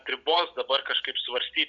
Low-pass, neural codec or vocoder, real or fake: 7.2 kHz; none; real